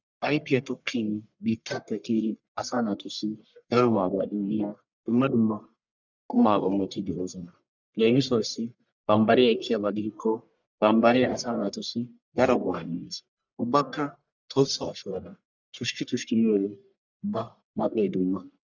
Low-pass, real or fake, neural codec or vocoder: 7.2 kHz; fake; codec, 44.1 kHz, 1.7 kbps, Pupu-Codec